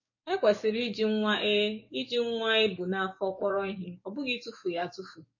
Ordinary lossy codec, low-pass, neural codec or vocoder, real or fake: MP3, 32 kbps; 7.2 kHz; codec, 44.1 kHz, 7.8 kbps, DAC; fake